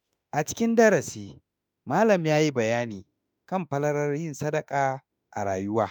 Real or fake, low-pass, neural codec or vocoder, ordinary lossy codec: fake; none; autoencoder, 48 kHz, 32 numbers a frame, DAC-VAE, trained on Japanese speech; none